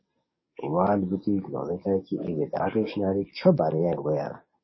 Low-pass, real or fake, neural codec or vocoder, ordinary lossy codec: 7.2 kHz; fake; codec, 44.1 kHz, 7.8 kbps, DAC; MP3, 24 kbps